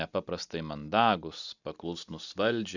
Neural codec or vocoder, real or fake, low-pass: none; real; 7.2 kHz